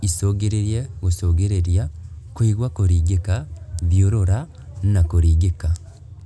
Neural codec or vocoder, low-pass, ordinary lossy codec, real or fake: none; none; none; real